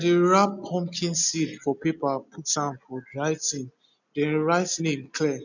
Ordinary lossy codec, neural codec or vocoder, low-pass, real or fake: none; none; 7.2 kHz; real